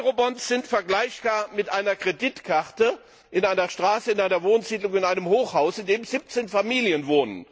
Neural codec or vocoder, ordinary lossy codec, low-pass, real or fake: none; none; none; real